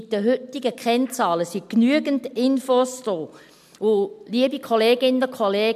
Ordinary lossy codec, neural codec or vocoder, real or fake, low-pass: MP3, 96 kbps; none; real; 14.4 kHz